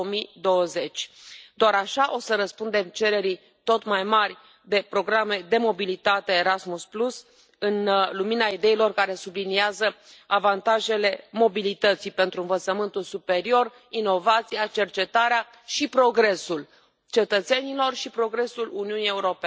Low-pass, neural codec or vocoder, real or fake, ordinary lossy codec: none; none; real; none